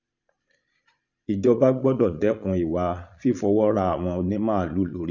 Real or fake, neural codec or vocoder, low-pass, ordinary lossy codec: fake; vocoder, 22.05 kHz, 80 mel bands, Vocos; 7.2 kHz; none